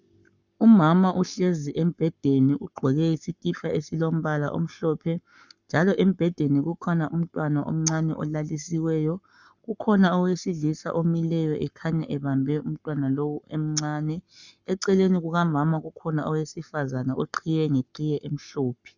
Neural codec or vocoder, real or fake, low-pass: codec, 44.1 kHz, 7.8 kbps, Pupu-Codec; fake; 7.2 kHz